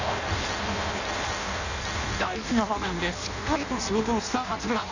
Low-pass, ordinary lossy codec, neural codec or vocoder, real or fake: 7.2 kHz; none; codec, 16 kHz in and 24 kHz out, 0.6 kbps, FireRedTTS-2 codec; fake